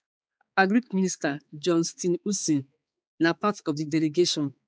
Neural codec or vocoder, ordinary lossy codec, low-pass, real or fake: codec, 16 kHz, 4 kbps, X-Codec, HuBERT features, trained on balanced general audio; none; none; fake